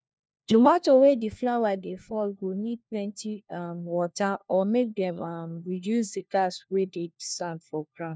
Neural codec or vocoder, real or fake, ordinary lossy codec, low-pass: codec, 16 kHz, 1 kbps, FunCodec, trained on LibriTTS, 50 frames a second; fake; none; none